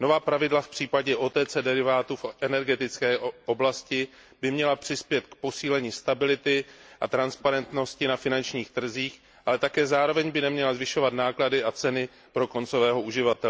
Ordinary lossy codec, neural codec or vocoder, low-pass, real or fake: none; none; none; real